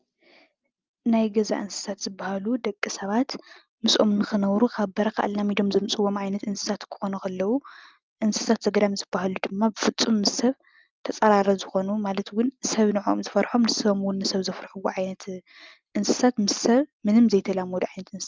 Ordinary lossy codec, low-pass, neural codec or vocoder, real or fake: Opus, 24 kbps; 7.2 kHz; none; real